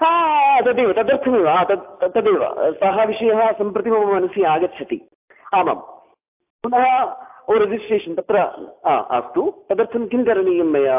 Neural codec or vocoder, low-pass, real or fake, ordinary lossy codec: none; 3.6 kHz; real; AAC, 32 kbps